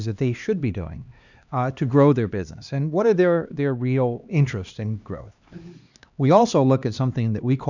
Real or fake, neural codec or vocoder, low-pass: fake; codec, 16 kHz, 2 kbps, X-Codec, HuBERT features, trained on LibriSpeech; 7.2 kHz